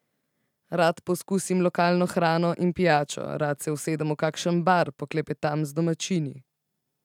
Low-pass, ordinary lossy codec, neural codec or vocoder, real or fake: 19.8 kHz; none; none; real